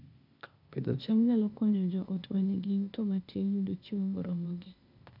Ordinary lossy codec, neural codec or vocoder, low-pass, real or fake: MP3, 48 kbps; codec, 16 kHz, 0.8 kbps, ZipCodec; 5.4 kHz; fake